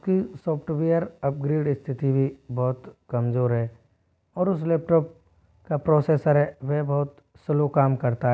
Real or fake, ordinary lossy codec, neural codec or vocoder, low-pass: real; none; none; none